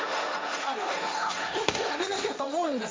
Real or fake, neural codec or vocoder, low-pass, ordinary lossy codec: fake; codec, 16 kHz, 1.1 kbps, Voila-Tokenizer; none; none